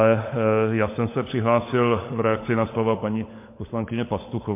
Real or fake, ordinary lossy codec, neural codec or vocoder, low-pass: fake; MP3, 24 kbps; codec, 16 kHz, 16 kbps, FunCodec, trained on LibriTTS, 50 frames a second; 3.6 kHz